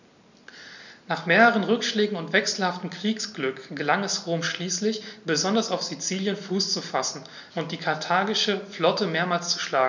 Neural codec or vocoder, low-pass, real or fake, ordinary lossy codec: none; 7.2 kHz; real; none